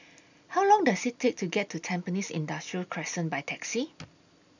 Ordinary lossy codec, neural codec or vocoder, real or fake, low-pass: none; none; real; 7.2 kHz